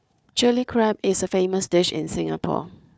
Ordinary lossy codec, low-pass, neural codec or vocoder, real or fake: none; none; codec, 16 kHz, 4 kbps, FunCodec, trained on Chinese and English, 50 frames a second; fake